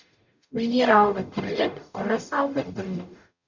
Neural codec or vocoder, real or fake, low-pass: codec, 44.1 kHz, 0.9 kbps, DAC; fake; 7.2 kHz